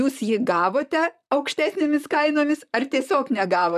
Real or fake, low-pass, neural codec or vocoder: fake; 14.4 kHz; vocoder, 44.1 kHz, 128 mel bands, Pupu-Vocoder